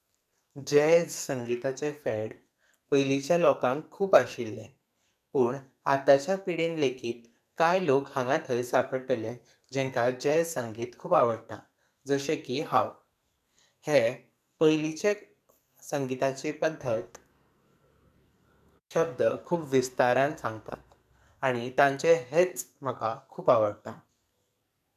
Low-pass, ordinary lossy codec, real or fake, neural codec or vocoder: 14.4 kHz; none; fake; codec, 44.1 kHz, 2.6 kbps, SNAC